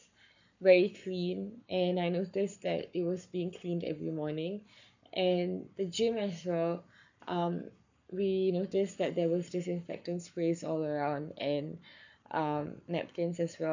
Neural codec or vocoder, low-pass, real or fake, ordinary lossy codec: codec, 44.1 kHz, 7.8 kbps, Pupu-Codec; 7.2 kHz; fake; none